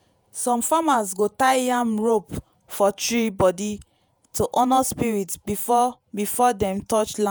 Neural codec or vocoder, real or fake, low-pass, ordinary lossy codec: vocoder, 48 kHz, 128 mel bands, Vocos; fake; none; none